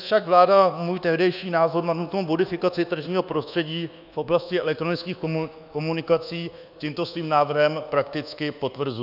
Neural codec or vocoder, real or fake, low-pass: codec, 24 kHz, 1.2 kbps, DualCodec; fake; 5.4 kHz